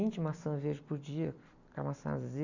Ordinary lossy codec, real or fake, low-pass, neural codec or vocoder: none; real; 7.2 kHz; none